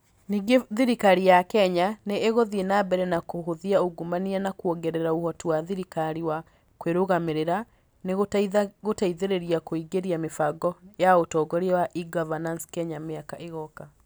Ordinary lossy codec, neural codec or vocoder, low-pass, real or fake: none; none; none; real